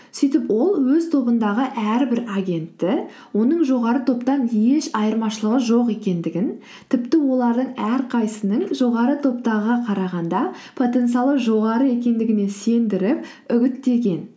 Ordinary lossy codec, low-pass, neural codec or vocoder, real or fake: none; none; none; real